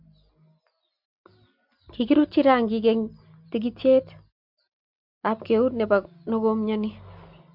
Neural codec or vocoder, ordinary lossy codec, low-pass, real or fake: none; MP3, 48 kbps; 5.4 kHz; real